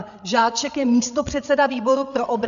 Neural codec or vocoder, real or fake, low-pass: codec, 16 kHz, 8 kbps, FreqCodec, larger model; fake; 7.2 kHz